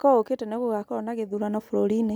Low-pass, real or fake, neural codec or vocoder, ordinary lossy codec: none; real; none; none